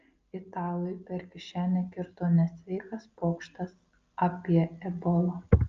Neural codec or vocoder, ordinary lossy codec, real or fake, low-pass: none; Opus, 24 kbps; real; 7.2 kHz